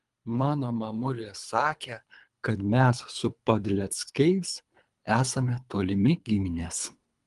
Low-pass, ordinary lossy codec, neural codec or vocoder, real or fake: 10.8 kHz; Opus, 32 kbps; codec, 24 kHz, 3 kbps, HILCodec; fake